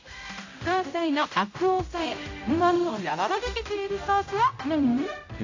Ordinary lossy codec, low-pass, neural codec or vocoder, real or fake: AAC, 48 kbps; 7.2 kHz; codec, 16 kHz, 0.5 kbps, X-Codec, HuBERT features, trained on general audio; fake